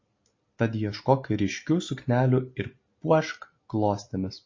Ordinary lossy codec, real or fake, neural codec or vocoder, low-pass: MP3, 32 kbps; real; none; 7.2 kHz